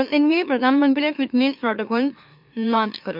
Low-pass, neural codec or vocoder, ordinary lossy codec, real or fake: 5.4 kHz; autoencoder, 44.1 kHz, a latent of 192 numbers a frame, MeloTTS; MP3, 48 kbps; fake